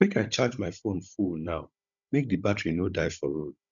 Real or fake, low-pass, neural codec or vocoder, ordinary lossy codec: fake; 7.2 kHz; codec, 16 kHz, 16 kbps, FunCodec, trained on Chinese and English, 50 frames a second; none